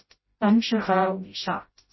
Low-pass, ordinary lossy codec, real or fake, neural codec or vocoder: 7.2 kHz; MP3, 24 kbps; fake; codec, 16 kHz, 0.5 kbps, FreqCodec, smaller model